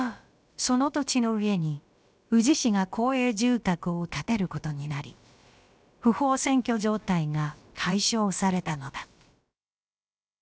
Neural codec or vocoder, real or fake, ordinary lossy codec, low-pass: codec, 16 kHz, about 1 kbps, DyCAST, with the encoder's durations; fake; none; none